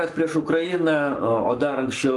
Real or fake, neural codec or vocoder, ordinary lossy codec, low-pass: fake; codec, 44.1 kHz, 7.8 kbps, Pupu-Codec; Opus, 24 kbps; 10.8 kHz